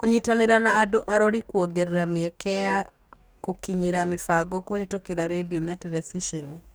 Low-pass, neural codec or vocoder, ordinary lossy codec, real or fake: none; codec, 44.1 kHz, 2.6 kbps, DAC; none; fake